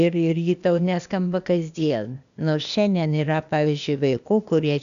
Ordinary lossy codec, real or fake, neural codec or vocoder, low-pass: MP3, 96 kbps; fake; codec, 16 kHz, 0.8 kbps, ZipCodec; 7.2 kHz